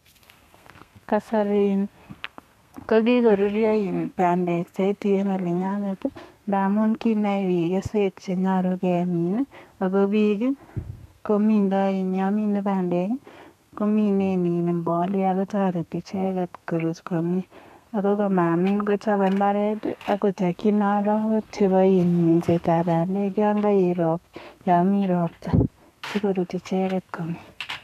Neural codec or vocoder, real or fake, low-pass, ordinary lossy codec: codec, 32 kHz, 1.9 kbps, SNAC; fake; 14.4 kHz; none